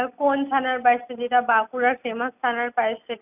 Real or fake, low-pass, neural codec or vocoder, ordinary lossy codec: real; 3.6 kHz; none; none